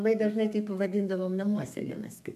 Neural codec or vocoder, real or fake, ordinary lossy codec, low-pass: codec, 32 kHz, 1.9 kbps, SNAC; fake; AAC, 96 kbps; 14.4 kHz